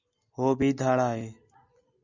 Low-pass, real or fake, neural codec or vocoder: 7.2 kHz; real; none